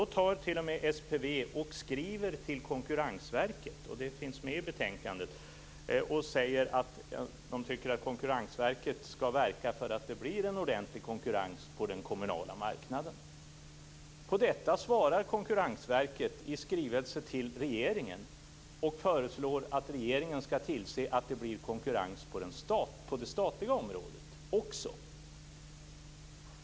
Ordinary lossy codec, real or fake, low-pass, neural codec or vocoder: none; real; none; none